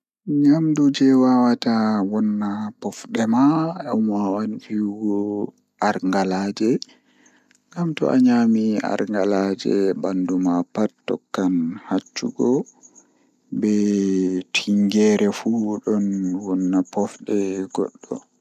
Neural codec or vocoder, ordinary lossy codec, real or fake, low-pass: none; none; real; 14.4 kHz